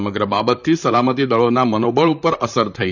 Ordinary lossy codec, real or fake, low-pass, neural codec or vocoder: none; fake; 7.2 kHz; vocoder, 44.1 kHz, 128 mel bands, Pupu-Vocoder